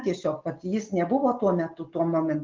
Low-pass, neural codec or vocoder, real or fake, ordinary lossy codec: 7.2 kHz; none; real; Opus, 32 kbps